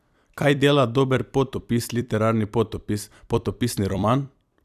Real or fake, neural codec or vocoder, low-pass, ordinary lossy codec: fake; vocoder, 44.1 kHz, 128 mel bands every 256 samples, BigVGAN v2; 14.4 kHz; none